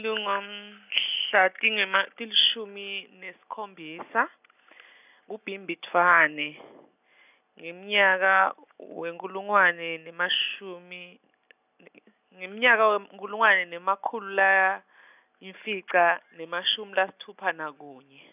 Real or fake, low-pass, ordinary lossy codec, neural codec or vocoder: real; 3.6 kHz; none; none